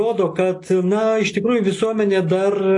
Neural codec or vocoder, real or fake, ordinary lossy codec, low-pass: none; real; AAC, 48 kbps; 10.8 kHz